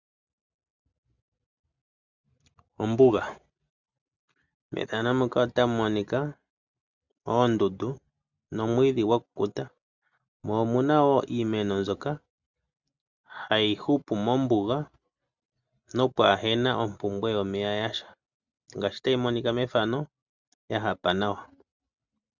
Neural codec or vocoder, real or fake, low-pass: none; real; 7.2 kHz